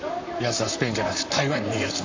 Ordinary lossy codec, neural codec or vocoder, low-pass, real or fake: AAC, 48 kbps; vocoder, 44.1 kHz, 128 mel bands, Pupu-Vocoder; 7.2 kHz; fake